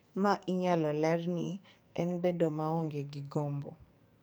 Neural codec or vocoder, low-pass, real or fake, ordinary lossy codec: codec, 44.1 kHz, 2.6 kbps, SNAC; none; fake; none